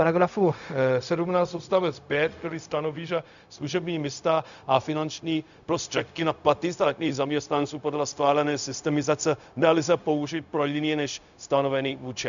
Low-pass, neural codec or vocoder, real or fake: 7.2 kHz; codec, 16 kHz, 0.4 kbps, LongCat-Audio-Codec; fake